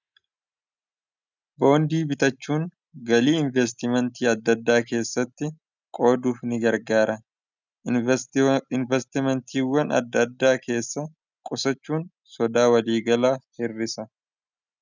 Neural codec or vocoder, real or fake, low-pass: none; real; 7.2 kHz